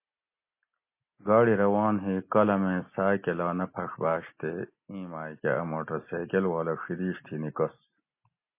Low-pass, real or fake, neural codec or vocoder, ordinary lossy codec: 3.6 kHz; real; none; MP3, 24 kbps